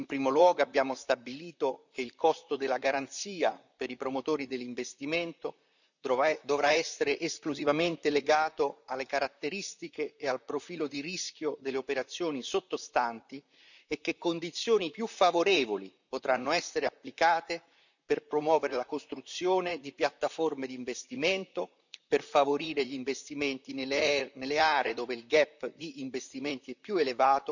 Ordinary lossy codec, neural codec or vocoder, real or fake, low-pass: none; vocoder, 44.1 kHz, 128 mel bands, Pupu-Vocoder; fake; 7.2 kHz